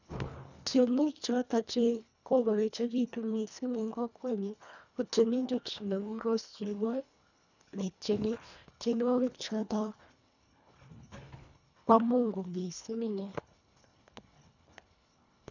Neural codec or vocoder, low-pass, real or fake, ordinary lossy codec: codec, 24 kHz, 1.5 kbps, HILCodec; 7.2 kHz; fake; none